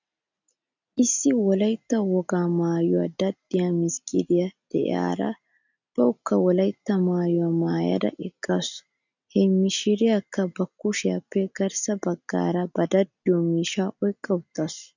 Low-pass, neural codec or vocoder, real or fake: 7.2 kHz; none; real